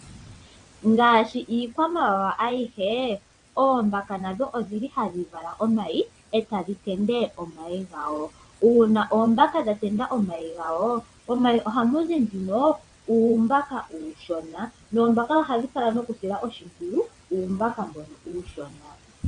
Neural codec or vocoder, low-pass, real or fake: vocoder, 22.05 kHz, 80 mel bands, WaveNeXt; 9.9 kHz; fake